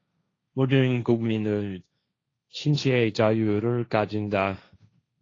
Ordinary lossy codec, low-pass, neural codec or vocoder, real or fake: AAC, 32 kbps; 7.2 kHz; codec, 16 kHz, 1.1 kbps, Voila-Tokenizer; fake